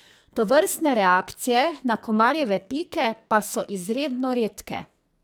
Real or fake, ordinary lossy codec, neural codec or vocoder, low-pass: fake; none; codec, 44.1 kHz, 2.6 kbps, SNAC; none